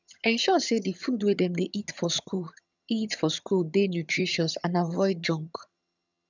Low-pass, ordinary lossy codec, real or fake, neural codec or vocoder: 7.2 kHz; none; fake; vocoder, 22.05 kHz, 80 mel bands, HiFi-GAN